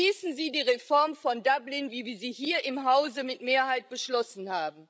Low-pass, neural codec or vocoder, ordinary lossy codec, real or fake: none; codec, 16 kHz, 16 kbps, FreqCodec, larger model; none; fake